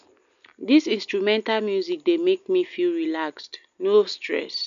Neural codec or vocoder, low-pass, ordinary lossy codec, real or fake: none; 7.2 kHz; none; real